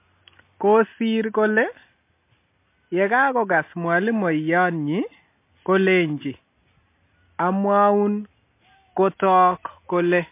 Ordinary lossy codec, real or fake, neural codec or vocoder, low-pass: MP3, 24 kbps; real; none; 3.6 kHz